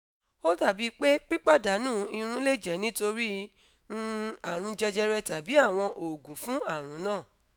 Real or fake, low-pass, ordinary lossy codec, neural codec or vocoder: fake; none; none; autoencoder, 48 kHz, 128 numbers a frame, DAC-VAE, trained on Japanese speech